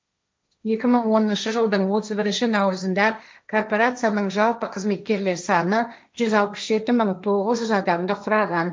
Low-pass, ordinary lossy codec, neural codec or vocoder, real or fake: none; none; codec, 16 kHz, 1.1 kbps, Voila-Tokenizer; fake